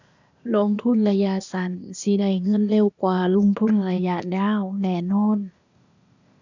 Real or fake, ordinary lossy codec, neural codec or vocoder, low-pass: fake; none; codec, 16 kHz, 0.8 kbps, ZipCodec; 7.2 kHz